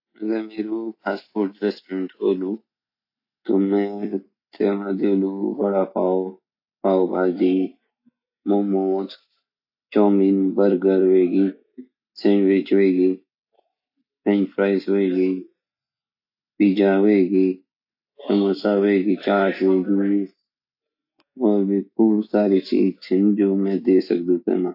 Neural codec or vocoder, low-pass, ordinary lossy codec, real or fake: none; 5.4 kHz; AAC, 48 kbps; real